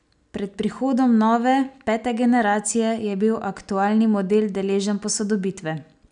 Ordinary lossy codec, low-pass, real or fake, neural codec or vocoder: none; 9.9 kHz; real; none